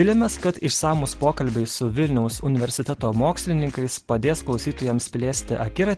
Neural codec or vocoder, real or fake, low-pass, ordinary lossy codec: none; real; 10.8 kHz; Opus, 16 kbps